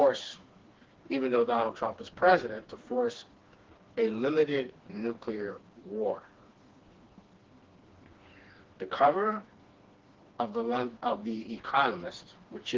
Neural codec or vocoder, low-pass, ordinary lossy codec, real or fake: codec, 16 kHz, 2 kbps, FreqCodec, smaller model; 7.2 kHz; Opus, 16 kbps; fake